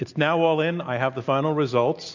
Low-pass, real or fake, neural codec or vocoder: 7.2 kHz; real; none